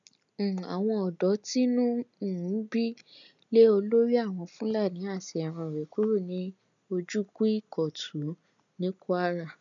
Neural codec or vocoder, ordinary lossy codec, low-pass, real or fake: none; none; 7.2 kHz; real